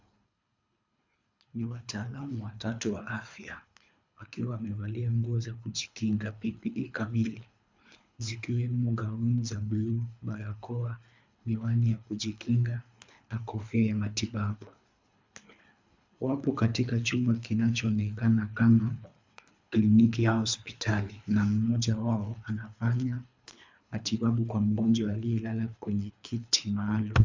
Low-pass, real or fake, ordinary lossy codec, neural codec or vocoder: 7.2 kHz; fake; MP3, 64 kbps; codec, 24 kHz, 3 kbps, HILCodec